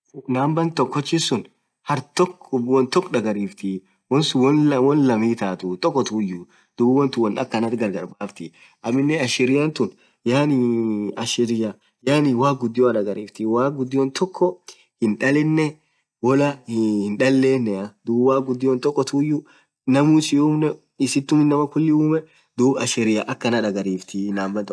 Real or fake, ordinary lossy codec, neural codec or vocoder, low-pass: real; none; none; none